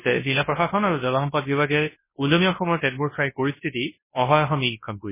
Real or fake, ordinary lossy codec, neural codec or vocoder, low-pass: fake; MP3, 16 kbps; codec, 24 kHz, 0.9 kbps, WavTokenizer, large speech release; 3.6 kHz